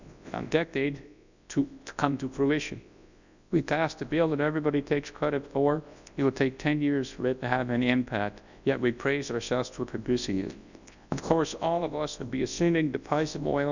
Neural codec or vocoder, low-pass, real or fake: codec, 24 kHz, 0.9 kbps, WavTokenizer, large speech release; 7.2 kHz; fake